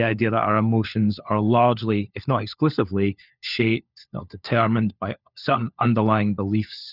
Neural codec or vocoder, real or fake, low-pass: codec, 16 kHz, 4 kbps, FunCodec, trained on LibriTTS, 50 frames a second; fake; 5.4 kHz